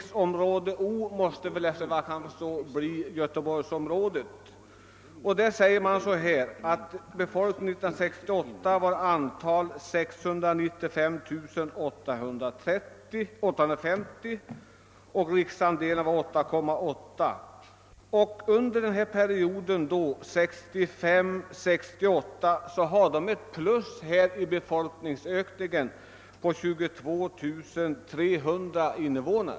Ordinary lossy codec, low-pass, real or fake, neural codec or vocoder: none; none; real; none